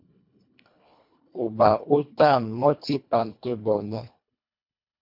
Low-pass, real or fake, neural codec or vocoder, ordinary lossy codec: 5.4 kHz; fake; codec, 24 kHz, 1.5 kbps, HILCodec; MP3, 48 kbps